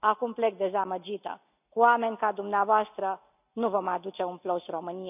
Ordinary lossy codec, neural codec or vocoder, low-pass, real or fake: none; none; 3.6 kHz; real